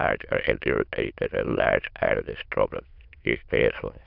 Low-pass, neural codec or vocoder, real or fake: 5.4 kHz; autoencoder, 22.05 kHz, a latent of 192 numbers a frame, VITS, trained on many speakers; fake